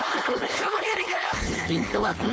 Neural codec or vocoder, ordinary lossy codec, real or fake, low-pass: codec, 16 kHz, 4.8 kbps, FACodec; none; fake; none